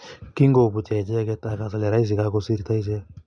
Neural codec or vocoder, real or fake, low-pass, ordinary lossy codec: none; real; 9.9 kHz; none